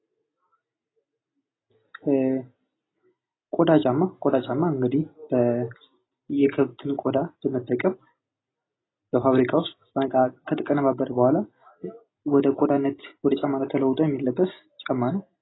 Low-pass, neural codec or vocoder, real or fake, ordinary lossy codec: 7.2 kHz; none; real; AAC, 16 kbps